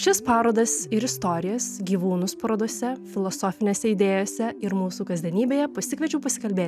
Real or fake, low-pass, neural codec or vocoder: real; 14.4 kHz; none